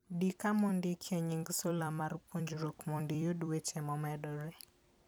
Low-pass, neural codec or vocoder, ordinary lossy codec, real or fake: none; vocoder, 44.1 kHz, 128 mel bands every 256 samples, BigVGAN v2; none; fake